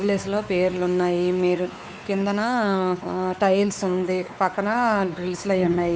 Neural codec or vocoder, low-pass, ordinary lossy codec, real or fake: codec, 16 kHz, 4 kbps, X-Codec, WavLM features, trained on Multilingual LibriSpeech; none; none; fake